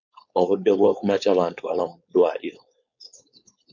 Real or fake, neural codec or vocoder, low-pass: fake; codec, 16 kHz, 4.8 kbps, FACodec; 7.2 kHz